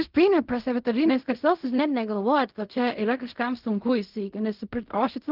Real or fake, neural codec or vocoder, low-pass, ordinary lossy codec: fake; codec, 16 kHz in and 24 kHz out, 0.4 kbps, LongCat-Audio-Codec, fine tuned four codebook decoder; 5.4 kHz; Opus, 32 kbps